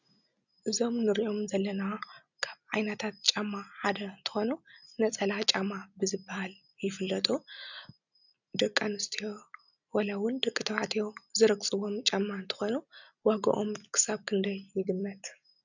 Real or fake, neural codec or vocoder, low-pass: real; none; 7.2 kHz